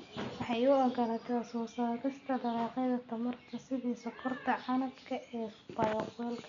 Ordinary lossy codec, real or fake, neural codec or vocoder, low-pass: none; real; none; 7.2 kHz